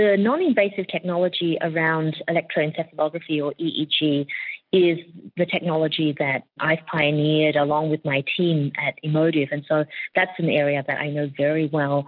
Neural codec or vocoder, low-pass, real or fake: none; 5.4 kHz; real